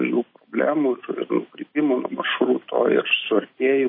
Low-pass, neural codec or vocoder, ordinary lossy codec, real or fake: 5.4 kHz; none; MP3, 24 kbps; real